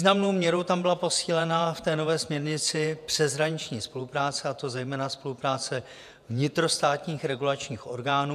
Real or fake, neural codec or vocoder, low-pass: fake; vocoder, 48 kHz, 128 mel bands, Vocos; 14.4 kHz